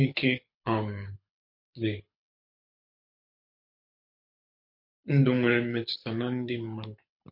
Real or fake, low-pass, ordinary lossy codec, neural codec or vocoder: fake; 5.4 kHz; MP3, 32 kbps; codec, 24 kHz, 6 kbps, HILCodec